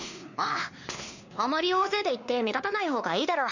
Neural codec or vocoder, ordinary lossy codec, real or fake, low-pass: codec, 16 kHz, 2 kbps, X-Codec, WavLM features, trained on Multilingual LibriSpeech; none; fake; 7.2 kHz